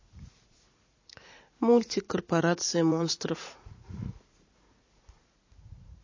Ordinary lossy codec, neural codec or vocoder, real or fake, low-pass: MP3, 32 kbps; vocoder, 44.1 kHz, 80 mel bands, Vocos; fake; 7.2 kHz